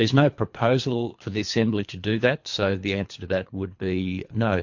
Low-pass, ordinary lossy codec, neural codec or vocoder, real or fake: 7.2 kHz; MP3, 48 kbps; codec, 24 kHz, 3 kbps, HILCodec; fake